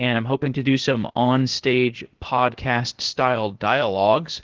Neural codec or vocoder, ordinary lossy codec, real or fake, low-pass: codec, 16 kHz, 0.8 kbps, ZipCodec; Opus, 16 kbps; fake; 7.2 kHz